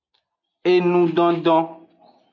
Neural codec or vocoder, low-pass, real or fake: none; 7.2 kHz; real